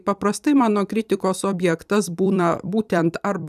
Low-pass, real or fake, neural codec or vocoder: 14.4 kHz; fake; vocoder, 44.1 kHz, 128 mel bands every 256 samples, BigVGAN v2